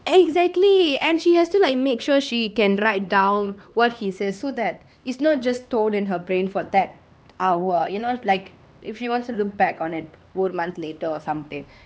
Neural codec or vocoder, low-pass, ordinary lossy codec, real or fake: codec, 16 kHz, 2 kbps, X-Codec, HuBERT features, trained on LibriSpeech; none; none; fake